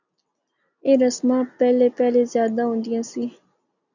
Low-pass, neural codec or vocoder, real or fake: 7.2 kHz; none; real